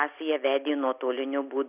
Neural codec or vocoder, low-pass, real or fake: none; 3.6 kHz; real